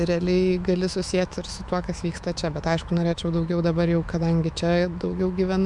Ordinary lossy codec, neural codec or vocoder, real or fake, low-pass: MP3, 96 kbps; autoencoder, 48 kHz, 128 numbers a frame, DAC-VAE, trained on Japanese speech; fake; 10.8 kHz